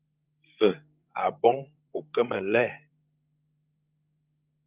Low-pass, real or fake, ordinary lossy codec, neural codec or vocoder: 3.6 kHz; fake; Opus, 24 kbps; codec, 16 kHz, 16 kbps, FreqCodec, larger model